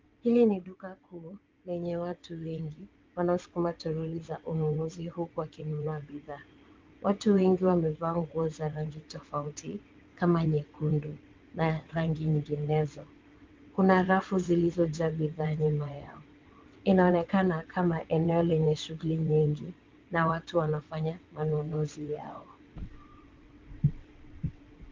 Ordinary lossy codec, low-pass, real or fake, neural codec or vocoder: Opus, 32 kbps; 7.2 kHz; fake; vocoder, 22.05 kHz, 80 mel bands, WaveNeXt